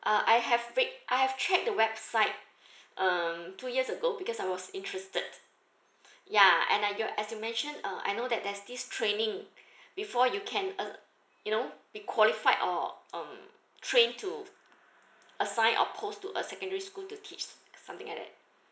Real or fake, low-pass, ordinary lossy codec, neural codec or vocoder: real; none; none; none